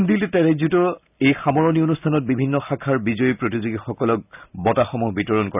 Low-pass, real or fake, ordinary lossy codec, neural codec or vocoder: 3.6 kHz; real; none; none